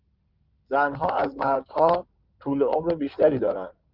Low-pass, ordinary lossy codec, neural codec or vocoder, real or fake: 5.4 kHz; Opus, 32 kbps; codec, 16 kHz in and 24 kHz out, 2.2 kbps, FireRedTTS-2 codec; fake